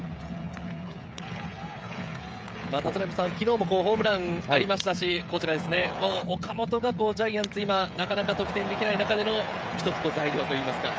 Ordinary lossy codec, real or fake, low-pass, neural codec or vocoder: none; fake; none; codec, 16 kHz, 16 kbps, FreqCodec, smaller model